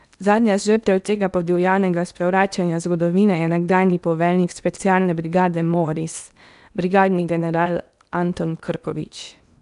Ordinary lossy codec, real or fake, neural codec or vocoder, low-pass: none; fake; codec, 16 kHz in and 24 kHz out, 0.8 kbps, FocalCodec, streaming, 65536 codes; 10.8 kHz